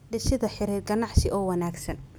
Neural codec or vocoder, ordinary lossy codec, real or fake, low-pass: none; none; real; none